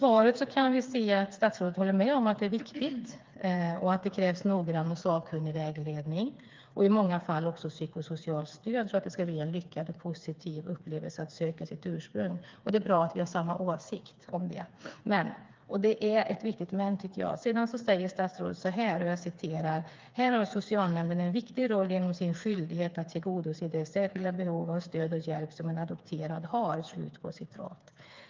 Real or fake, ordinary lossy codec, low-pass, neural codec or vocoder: fake; Opus, 32 kbps; 7.2 kHz; codec, 16 kHz, 4 kbps, FreqCodec, smaller model